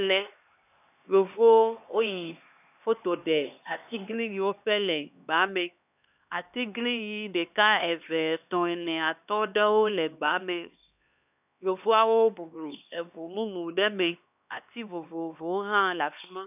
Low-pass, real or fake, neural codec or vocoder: 3.6 kHz; fake; codec, 16 kHz, 2 kbps, X-Codec, HuBERT features, trained on LibriSpeech